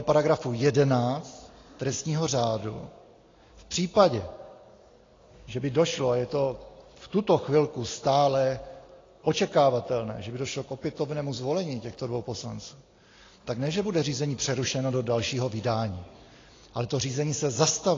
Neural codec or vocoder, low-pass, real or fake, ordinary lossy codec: none; 7.2 kHz; real; AAC, 32 kbps